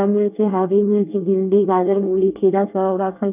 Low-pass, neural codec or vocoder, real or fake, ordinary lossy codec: 3.6 kHz; codec, 24 kHz, 1 kbps, SNAC; fake; none